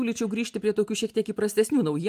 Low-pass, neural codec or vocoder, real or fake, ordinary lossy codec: 14.4 kHz; none; real; Opus, 32 kbps